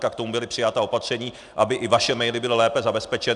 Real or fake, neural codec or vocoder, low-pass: real; none; 10.8 kHz